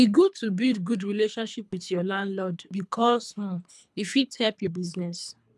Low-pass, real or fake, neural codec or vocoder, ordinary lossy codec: none; fake; codec, 24 kHz, 3 kbps, HILCodec; none